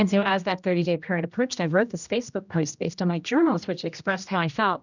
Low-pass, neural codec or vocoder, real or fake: 7.2 kHz; codec, 16 kHz, 1 kbps, X-Codec, HuBERT features, trained on general audio; fake